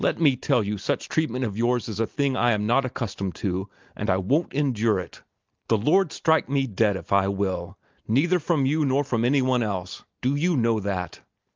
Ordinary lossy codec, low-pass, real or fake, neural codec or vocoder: Opus, 24 kbps; 7.2 kHz; real; none